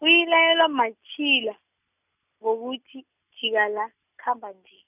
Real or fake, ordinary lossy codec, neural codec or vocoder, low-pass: real; none; none; 3.6 kHz